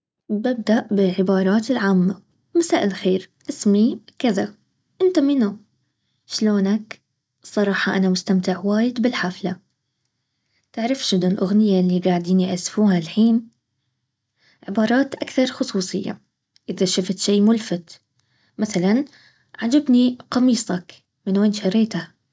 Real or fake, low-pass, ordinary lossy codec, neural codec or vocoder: real; none; none; none